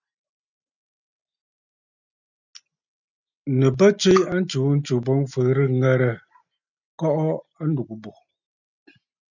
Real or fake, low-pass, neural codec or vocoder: real; 7.2 kHz; none